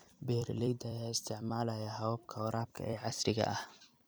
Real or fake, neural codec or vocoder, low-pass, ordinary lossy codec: fake; vocoder, 44.1 kHz, 128 mel bands every 256 samples, BigVGAN v2; none; none